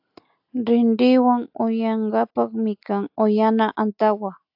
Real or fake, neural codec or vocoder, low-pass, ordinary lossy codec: real; none; 5.4 kHz; AAC, 48 kbps